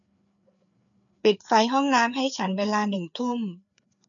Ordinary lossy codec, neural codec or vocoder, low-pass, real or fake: none; codec, 16 kHz, 4 kbps, FreqCodec, larger model; 7.2 kHz; fake